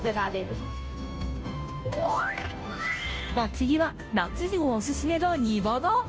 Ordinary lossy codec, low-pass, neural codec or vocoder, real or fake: none; none; codec, 16 kHz, 0.5 kbps, FunCodec, trained on Chinese and English, 25 frames a second; fake